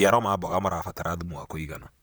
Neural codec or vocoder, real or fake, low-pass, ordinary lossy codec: vocoder, 44.1 kHz, 128 mel bands, Pupu-Vocoder; fake; none; none